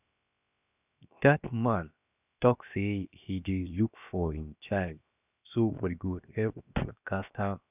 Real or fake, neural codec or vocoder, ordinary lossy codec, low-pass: fake; codec, 16 kHz, 0.7 kbps, FocalCodec; none; 3.6 kHz